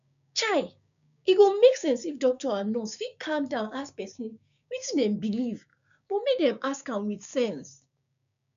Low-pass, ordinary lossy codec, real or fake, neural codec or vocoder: 7.2 kHz; none; fake; codec, 16 kHz, 6 kbps, DAC